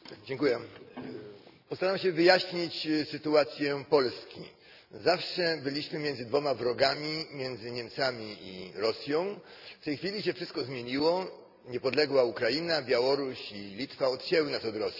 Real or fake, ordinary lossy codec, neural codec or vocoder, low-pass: real; none; none; 5.4 kHz